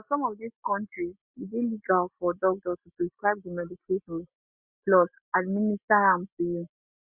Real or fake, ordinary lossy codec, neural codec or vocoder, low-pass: real; Opus, 64 kbps; none; 3.6 kHz